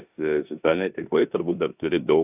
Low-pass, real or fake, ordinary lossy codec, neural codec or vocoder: 3.6 kHz; fake; AAC, 32 kbps; codec, 16 kHz in and 24 kHz out, 0.9 kbps, LongCat-Audio-Codec, four codebook decoder